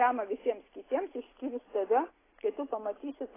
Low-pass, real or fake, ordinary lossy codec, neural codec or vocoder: 3.6 kHz; real; AAC, 16 kbps; none